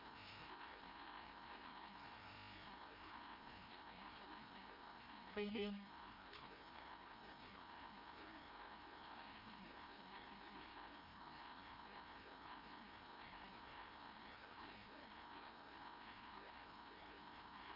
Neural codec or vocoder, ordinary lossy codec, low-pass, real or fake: codec, 16 kHz, 1 kbps, FreqCodec, larger model; MP3, 32 kbps; 5.4 kHz; fake